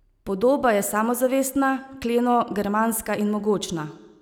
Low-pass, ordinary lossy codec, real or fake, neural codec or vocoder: none; none; real; none